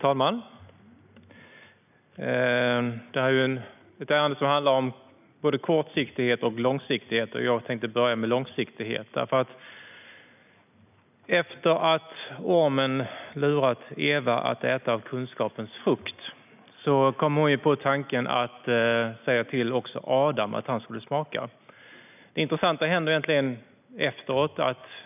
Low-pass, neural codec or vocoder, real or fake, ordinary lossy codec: 3.6 kHz; none; real; none